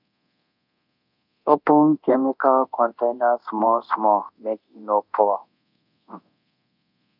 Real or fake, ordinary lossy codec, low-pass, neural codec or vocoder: fake; none; 5.4 kHz; codec, 24 kHz, 0.9 kbps, DualCodec